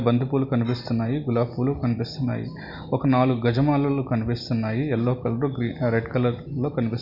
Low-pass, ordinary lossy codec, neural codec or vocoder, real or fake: 5.4 kHz; none; none; real